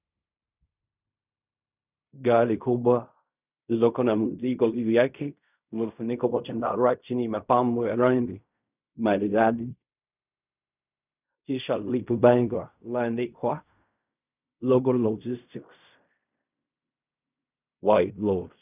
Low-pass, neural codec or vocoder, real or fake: 3.6 kHz; codec, 16 kHz in and 24 kHz out, 0.4 kbps, LongCat-Audio-Codec, fine tuned four codebook decoder; fake